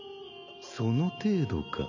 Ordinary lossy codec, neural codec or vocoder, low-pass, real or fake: none; none; 7.2 kHz; real